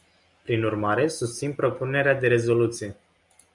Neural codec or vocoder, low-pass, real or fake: none; 10.8 kHz; real